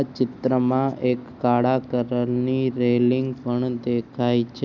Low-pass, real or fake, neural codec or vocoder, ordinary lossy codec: 7.2 kHz; real; none; none